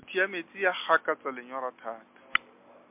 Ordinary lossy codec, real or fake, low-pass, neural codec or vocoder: MP3, 32 kbps; real; 3.6 kHz; none